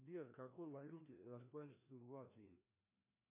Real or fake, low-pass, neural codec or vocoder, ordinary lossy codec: fake; 3.6 kHz; codec, 16 kHz, 1 kbps, FreqCodec, larger model; MP3, 24 kbps